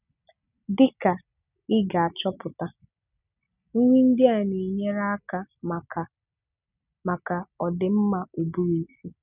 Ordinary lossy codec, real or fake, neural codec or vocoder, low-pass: none; real; none; 3.6 kHz